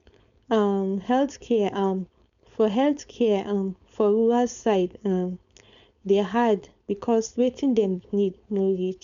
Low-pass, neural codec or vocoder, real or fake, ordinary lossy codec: 7.2 kHz; codec, 16 kHz, 4.8 kbps, FACodec; fake; none